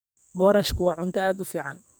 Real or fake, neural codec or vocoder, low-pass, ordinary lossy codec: fake; codec, 44.1 kHz, 2.6 kbps, SNAC; none; none